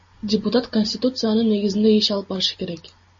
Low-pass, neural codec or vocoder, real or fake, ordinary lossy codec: 7.2 kHz; none; real; MP3, 32 kbps